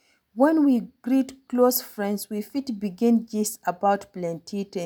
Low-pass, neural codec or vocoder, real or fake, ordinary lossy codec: none; none; real; none